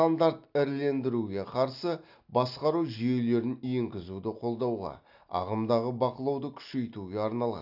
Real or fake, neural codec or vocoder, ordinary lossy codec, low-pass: real; none; AAC, 48 kbps; 5.4 kHz